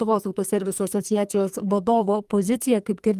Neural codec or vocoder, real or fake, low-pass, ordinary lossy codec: codec, 44.1 kHz, 2.6 kbps, SNAC; fake; 14.4 kHz; Opus, 32 kbps